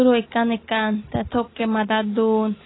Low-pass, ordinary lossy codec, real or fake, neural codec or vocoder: 7.2 kHz; AAC, 16 kbps; real; none